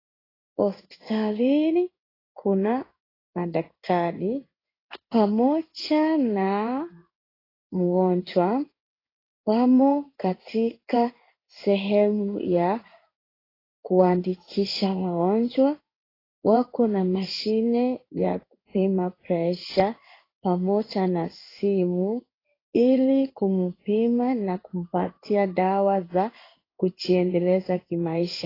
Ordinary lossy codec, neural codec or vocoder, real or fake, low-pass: AAC, 24 kbps; codec, 16 kHz in and 24 kHz out, 1 kbps, XY-Tokenizer; fake; 5.4 kHz